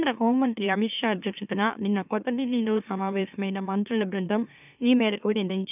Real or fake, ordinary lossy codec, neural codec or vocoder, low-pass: fake; none; autoencoder, 44.1 kHz, a latent of 192 numbers a frame, MeloTTS; 3.6 kHz